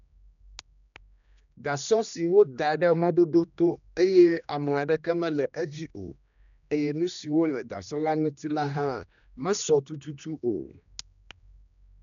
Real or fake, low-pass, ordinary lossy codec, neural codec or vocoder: fake; 7.2 kHz; none; codec, 16 kHz, 1 kbps, X-Codec, HuBERT features, trained on general audio